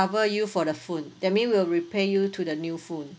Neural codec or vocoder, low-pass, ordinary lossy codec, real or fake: none; none; none; real